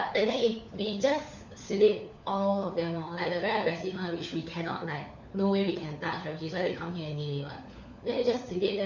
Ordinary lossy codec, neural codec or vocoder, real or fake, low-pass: none; codec, 16 kHz, 4 kbps, FunCodec, trained on LibriTTS, 50 frames a second; fake; 7.2 kHz